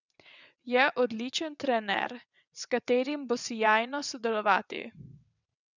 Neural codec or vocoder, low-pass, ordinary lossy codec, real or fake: vocoder, 22.05 kHz, 80 mel bands, WaveNeXt; 7.2 kHz; none; fake